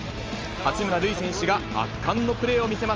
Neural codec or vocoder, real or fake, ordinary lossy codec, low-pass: none; real; Opus, 24 kbps; 7.2 kHz